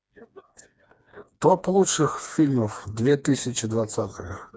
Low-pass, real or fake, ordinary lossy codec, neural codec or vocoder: none; fake; none; codec, 16 kHz, 2 kbps, FreqCodec, smaller model